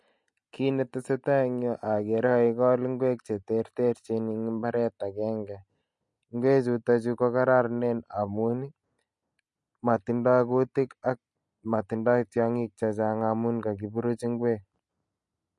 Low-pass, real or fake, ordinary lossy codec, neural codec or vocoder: 10.8 kHz; real; MP3, 48 kbps; none